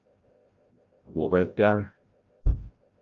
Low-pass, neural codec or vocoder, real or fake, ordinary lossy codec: 7.2 kHz; codec, 16 kHz, 0.5 kbps, FreqCodec, larger model; fake; Opus, 32 kbps